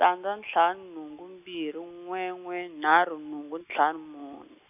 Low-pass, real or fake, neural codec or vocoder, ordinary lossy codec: 3.6 kHz; real; none; none